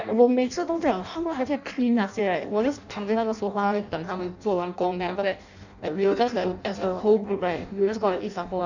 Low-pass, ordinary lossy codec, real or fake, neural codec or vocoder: 7.2 kHz; none; fake; codec, 16 kHz in and 24 kHz out, 0.6 kbps, FireRedTTS-2 codec